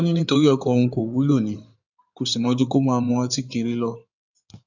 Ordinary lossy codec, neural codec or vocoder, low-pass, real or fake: none; codec, 16 kHz in and 24 kHz out, 2.2 kbps, FireRedTTS-2 codec; 7.2 kHz; fake